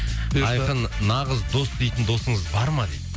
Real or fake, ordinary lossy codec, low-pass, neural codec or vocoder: real; none; none; none